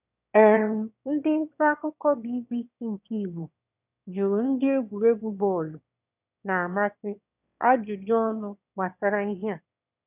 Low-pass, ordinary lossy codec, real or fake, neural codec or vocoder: 3.6 kHz; none; fake; autoencoder, 22.05 kHz, a latent of 192 numbers a frame, VITS, trained on one speaker